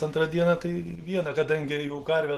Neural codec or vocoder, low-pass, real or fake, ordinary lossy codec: none; 14.4 kHz; real; Opus, 16 kbps